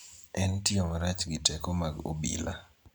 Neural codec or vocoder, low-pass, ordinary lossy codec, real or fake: none; none; none; real